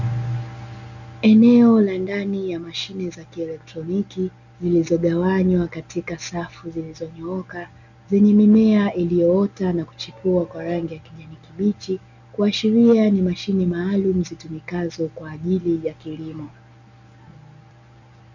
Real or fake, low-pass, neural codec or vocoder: real; 7.2 kHz; none